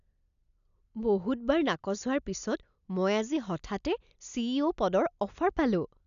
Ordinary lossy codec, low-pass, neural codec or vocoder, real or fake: none; 7.2 kHz; none; real